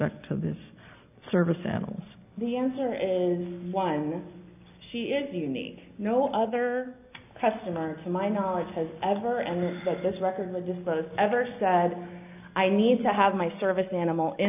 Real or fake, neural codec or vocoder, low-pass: real; none; 3.6 kHz